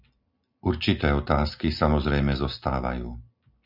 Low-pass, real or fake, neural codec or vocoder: 5.4 kHz; real; none